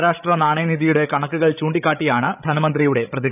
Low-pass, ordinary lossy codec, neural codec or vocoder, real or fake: 3.6 kHz; none; codec, 16 kHz, 16 kbps, FreqCodec, larger model; fake